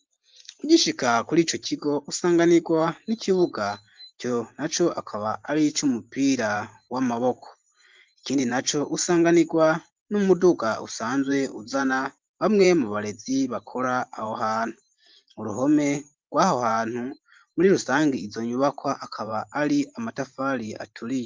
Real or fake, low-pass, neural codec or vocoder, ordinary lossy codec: fake; 7.2 kHz; vocoder, 44.1 kHz, 128 mel bands every 512 samples, BigVGAN v2; Opus, 24 kbps